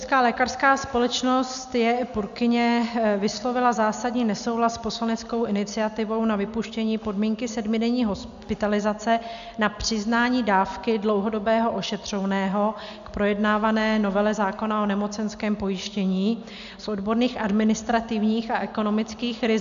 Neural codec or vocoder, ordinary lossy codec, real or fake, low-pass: none; AAC, 96 kbps; real; 7.2 kHz